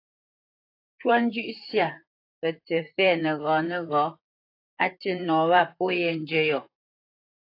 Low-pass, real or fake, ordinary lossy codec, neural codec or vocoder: 5.4 kHz; fake; AAC, 32 kbps; vocoder, 44.1 kHz, 128 mel bands, Pupu-Vocoder